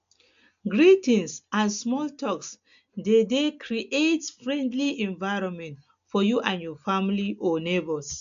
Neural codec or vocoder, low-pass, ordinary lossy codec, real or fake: none; 7.2 kHz; MP3, 64 kbps; real